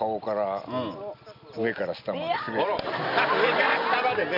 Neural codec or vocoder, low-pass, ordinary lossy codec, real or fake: none; 5.4 kHz; none; real